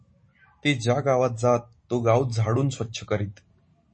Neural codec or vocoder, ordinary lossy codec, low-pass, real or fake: none; MP3, 32 kbps; 9.9 kHz; real